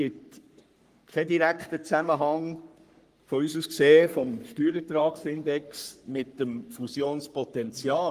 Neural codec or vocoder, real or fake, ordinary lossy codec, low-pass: codec, 44.1 kHz, 3.4 kbps, Pupu-Codec; fake; Opus, 32 kbps; 14.4 kHz